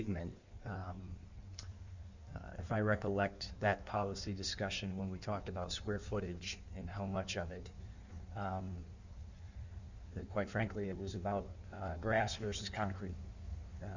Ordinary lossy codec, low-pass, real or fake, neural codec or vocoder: Opus, 64 kbps; 7.2 kHz; fake; codec, 16 kHz in and 24 kHz out, 1.1 kbps, FireRedTTS-2 codec